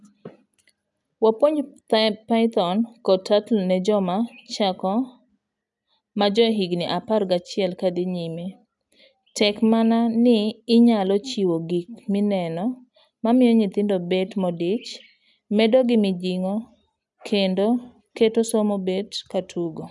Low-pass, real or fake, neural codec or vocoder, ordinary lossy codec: 10.8 kHz; real; none; none